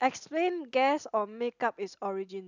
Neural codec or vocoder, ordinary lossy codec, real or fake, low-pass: none; none; real; 7.2 kHz